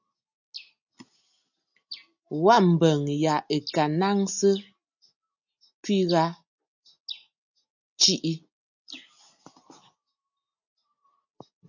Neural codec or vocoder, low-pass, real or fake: none; 7.2 kHz; real